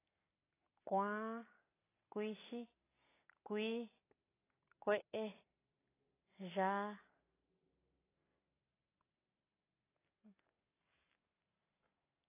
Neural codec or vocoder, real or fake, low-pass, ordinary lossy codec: none; real; 3.6 kHz; AAC, 16 kbps